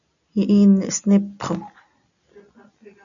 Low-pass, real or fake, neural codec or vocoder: 7.2 kHz; real; none